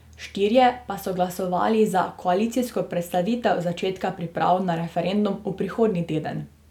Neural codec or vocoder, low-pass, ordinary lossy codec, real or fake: none; 19.8 kHz; none; real